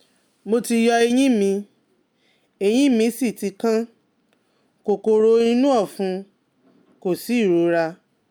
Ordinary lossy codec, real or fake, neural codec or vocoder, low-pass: none; real; none; none